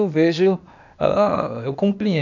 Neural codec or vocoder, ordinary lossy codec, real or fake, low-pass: codec, 16 kHz, 0.8 kbps, ZipCodec; none; fake; 7.2 kHz